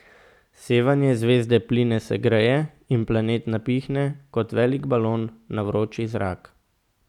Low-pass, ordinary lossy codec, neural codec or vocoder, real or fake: 19.8 kHz; none; none; real